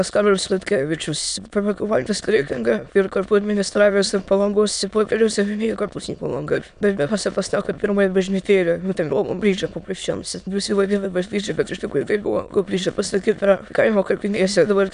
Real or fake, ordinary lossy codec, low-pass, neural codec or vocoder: fake; AAC, 96 kbps; 9.9 kHz; autoencoder, 22.05 kHz, a latent of 192 numbers a frame, VITS, trained on many speakers